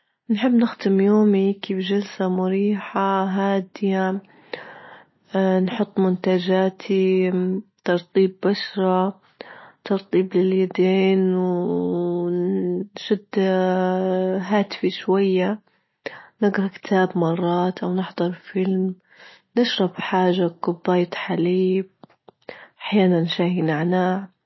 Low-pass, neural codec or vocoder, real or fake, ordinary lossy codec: 7.2 kHz; none; real; MP3, 24 kbps